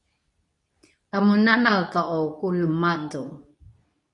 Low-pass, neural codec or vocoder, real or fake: 10.8 kHz; codec, 24 kHz, 0.9 kbps, WavTokenizer, medium speech release version 2; fake